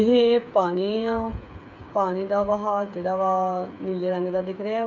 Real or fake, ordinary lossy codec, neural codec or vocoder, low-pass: fake; none; codec, 16 kHz, 8 kbps, FreqCodec, smaller model; 7.2 kHz